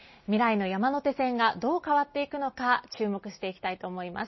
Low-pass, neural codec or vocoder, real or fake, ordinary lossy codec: 7.2 kHz; none; real; MP3, 24 kbps